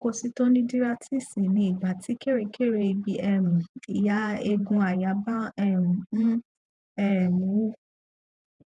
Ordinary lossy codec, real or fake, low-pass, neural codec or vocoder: none; fake; 10.8 kHz; vocoder, 48 kHz, 128 mel bands, Vocos